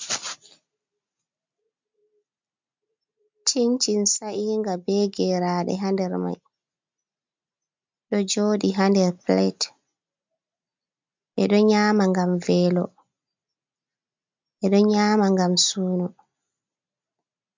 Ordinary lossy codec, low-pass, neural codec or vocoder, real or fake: MP3, 64 kbps; 7.2 kHz; none; real